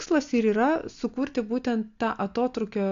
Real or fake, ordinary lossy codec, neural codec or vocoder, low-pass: real; AAC, 64 kbps; none; 7.2 kHz